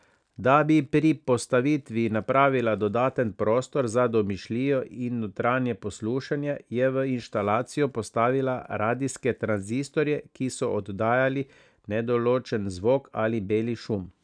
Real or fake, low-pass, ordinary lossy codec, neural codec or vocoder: real; 9.9 kHz; none; none